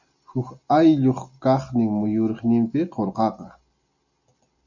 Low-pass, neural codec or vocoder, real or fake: 7.2 kHz; none; real